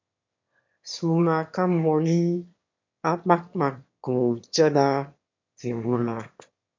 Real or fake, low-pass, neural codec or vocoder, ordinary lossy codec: fake; 7.2 kHz; autoencoder, 22.05 kHz, a latent of 192 numbers a frame, VITS, trained on one speaker; MP3, 48 kbps